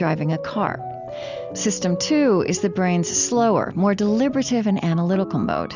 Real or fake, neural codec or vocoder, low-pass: real; none; 7.2 kHz